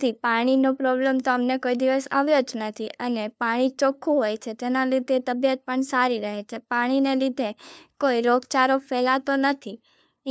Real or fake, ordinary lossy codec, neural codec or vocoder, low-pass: fake; none; codec, 16 kHz, 2 kbps, FunCodec, trained on LibriTTS, 25 frames a second; none